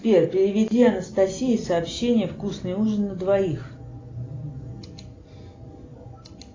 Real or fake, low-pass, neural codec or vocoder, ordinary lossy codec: real; 7.2 kHz; none; AAC, 32 kbps